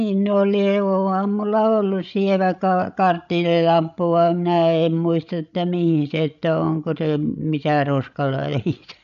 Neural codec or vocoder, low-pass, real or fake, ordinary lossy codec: codec, 16 kHz, 16 kbps, FreqCodec, larger model; 7.2 kHz; fake; none